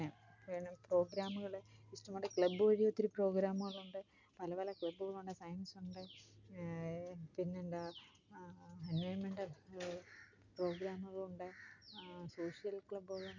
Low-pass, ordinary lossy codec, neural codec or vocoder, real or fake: 7.2 kHz; none; none; real